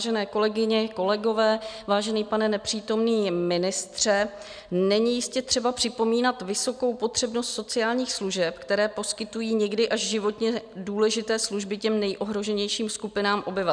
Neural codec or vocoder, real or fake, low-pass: none; real; 9.9 kHz